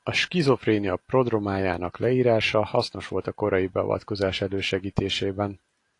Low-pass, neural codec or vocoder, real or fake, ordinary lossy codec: 10.8 kHz; none; real; AAC, 48 kbps